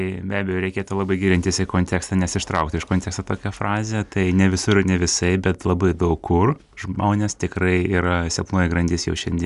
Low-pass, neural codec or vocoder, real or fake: 10.8 kHz; none; real